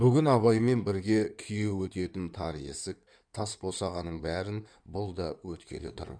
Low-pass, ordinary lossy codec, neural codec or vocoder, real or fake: 9.9 kHz; none; codec, 16 kHz in and 24 kHz out, 2.2 kbps, FireRedTTS-2 codec; fake